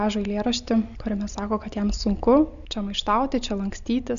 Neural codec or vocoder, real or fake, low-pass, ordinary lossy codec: none; real; 7.2 kHz; AAC, 96 kbps